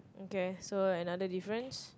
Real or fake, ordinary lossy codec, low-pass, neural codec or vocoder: real; none; none; none